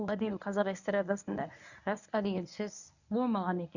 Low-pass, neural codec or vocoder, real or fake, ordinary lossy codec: 7.2 kHz; codec, 24 kHz, 0.9 kbps, WavTokenizer, medium speech release version 1; fake; none